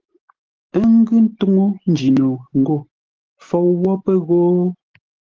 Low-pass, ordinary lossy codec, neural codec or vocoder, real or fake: 7.2 kHz; Opus, 16 kbps; none; real